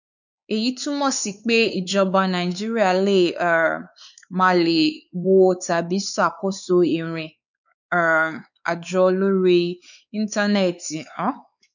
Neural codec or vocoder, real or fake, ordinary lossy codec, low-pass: codec, 16 kHz, 4 kbps, X-Codec, WavLM features, trained on Multilingual LibriSpeech; fake; none; 7.2 kHz